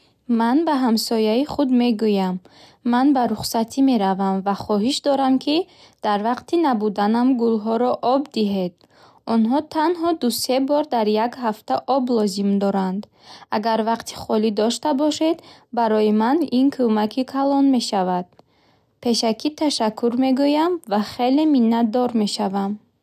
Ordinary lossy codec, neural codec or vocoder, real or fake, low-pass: none; none; real; 14.4 kHz